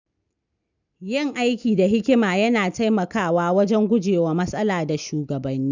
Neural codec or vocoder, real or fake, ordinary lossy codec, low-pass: none; real; none; 7.2 kHz